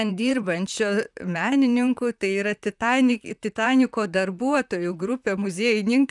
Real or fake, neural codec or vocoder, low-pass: fake; vocoder, 44.1 kHz, 128 mel bands, Pupu-Vocoder; 10.8 kHz